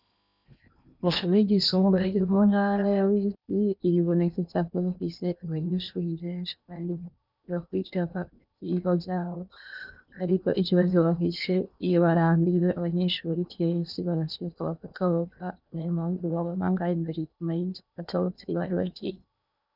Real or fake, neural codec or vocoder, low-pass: fake; codec, 16 kHz in and 24 kHz out, 0.8 kbps, FocalCodec, streaming, 65536 codes; 5.4 kHz